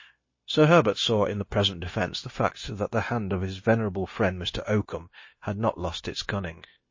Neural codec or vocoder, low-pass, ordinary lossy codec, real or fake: codec, 16 kHz, 0.9 kbps, LongCat-Audio-Codec; 7.2 kHz; MP3, 32 kbps; fake